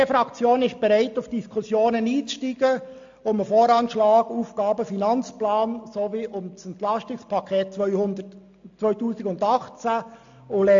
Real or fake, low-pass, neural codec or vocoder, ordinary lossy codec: real; 7.2 kHz; none; AAC, 64 kbps